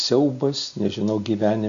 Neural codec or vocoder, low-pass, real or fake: none; 7.2 kHz; real